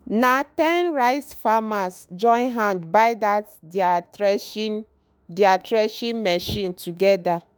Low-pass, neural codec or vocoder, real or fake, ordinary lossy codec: none; autoencoder, 48 kHz, 32 numbers a frame, DAC-VAE, trained on Japanese speech; fake; none